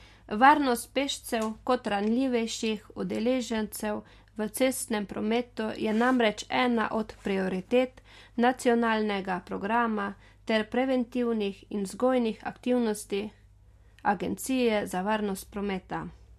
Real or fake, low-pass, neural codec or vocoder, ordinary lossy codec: real; 14.4 kHz; none; MP3, 64 kbps